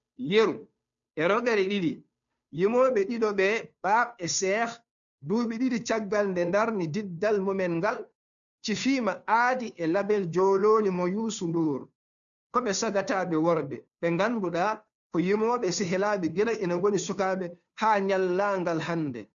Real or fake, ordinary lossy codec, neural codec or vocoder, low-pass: fake; none; codec, 16 kHz, 2 kbps, FunCodec, trained on Chinese and English, 25 frames a second; 7.2 kHz